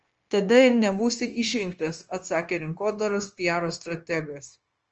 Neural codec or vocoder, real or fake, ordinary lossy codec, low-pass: codec, 16 kHz, 0.9 kbps, LongCat-Audio-Codec; fake; Opus, 24 kbps; 7.2 kHz